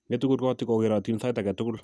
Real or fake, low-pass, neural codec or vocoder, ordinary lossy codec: real; none; none; none